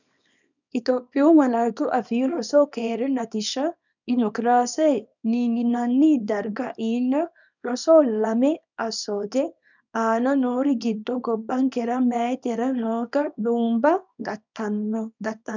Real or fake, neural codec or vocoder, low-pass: fake; codec, 24 kHz, 0.9 kbps, WavTokenizer, small release; 7.2 kHz